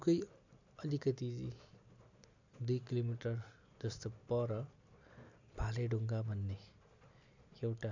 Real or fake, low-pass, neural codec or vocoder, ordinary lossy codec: real; 7.2 kHz; none; none